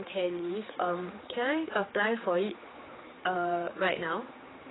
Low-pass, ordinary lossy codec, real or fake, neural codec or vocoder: 7.2 kHz; AAC, 16 kbps; fake; codec, 16 kHz, 4 kbps, X-Codec, HuBERT features, trained on general audio